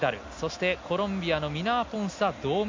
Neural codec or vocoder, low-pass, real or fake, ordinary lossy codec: none; 7.2 kHz; real; MP3, 48 kbps